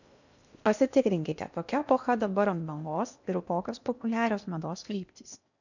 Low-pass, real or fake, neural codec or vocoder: 7.2 kHz; fake; codec, 16 kHz in and 24 kHz out, 0.8 kbps, FocalCodec, streaming, 65536 codes